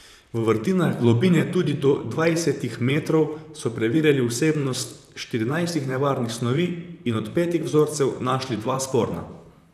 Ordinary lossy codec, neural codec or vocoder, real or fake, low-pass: none; vocoder, 44.1 kHz, 128 mel bands, Pupu-Vocoder; fake; 14.4 kHz